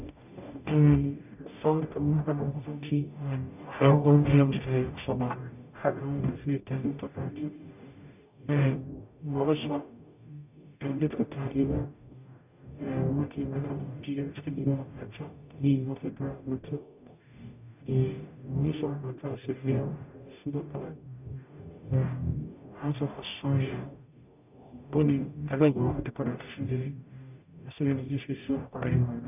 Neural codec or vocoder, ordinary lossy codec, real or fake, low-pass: codec, 44.1 kHz, 0.9 kbps, DAC; none; fake; 3.6 kHz